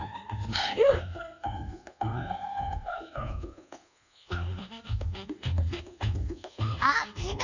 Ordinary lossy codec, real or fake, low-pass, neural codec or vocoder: none; fake; 7.2 kHz; codec, 24 kHz, 1.2 kbps, DualCodec